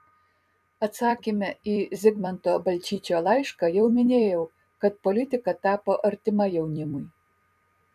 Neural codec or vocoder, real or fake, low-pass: vocoder, 44.1 kHz, 128 mel bands every 256 samples, BigVGAN v2; fake; 14.4 kHz